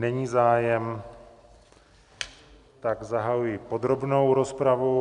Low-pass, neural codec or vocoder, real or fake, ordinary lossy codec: 10.8 kHz; none; real; MP3, 96 kbps